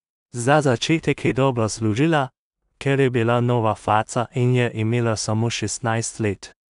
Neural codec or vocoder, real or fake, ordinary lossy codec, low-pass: codec, 16 kHz in and 24 kHz out, 0.4 kbps, LongCat-Audio-Codec, two codebook decoder; fake; none; 10.8 kHz